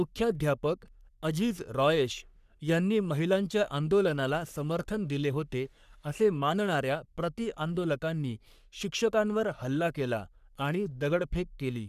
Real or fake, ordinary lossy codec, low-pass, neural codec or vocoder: fake; none; 14.4 kHz; codec, 44.1 kHz, 3.4 kbps, Pupu-Codec